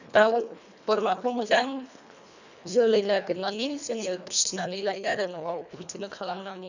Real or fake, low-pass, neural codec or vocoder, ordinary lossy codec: fake; 7.2 kHz; codec, 24 kHz, 1.5 kbps, HILCodec; none